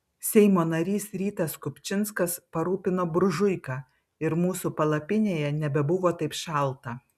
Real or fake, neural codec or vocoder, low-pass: real; none; 14.4 kHz